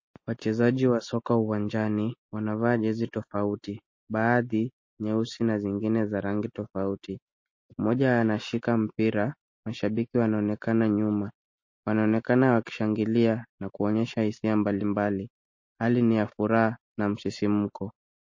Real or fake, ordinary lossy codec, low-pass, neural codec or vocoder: real; MP3, 32 kbps; 7.2 kHz; none